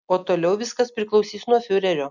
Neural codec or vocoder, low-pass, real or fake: none; 7.2 kHz; real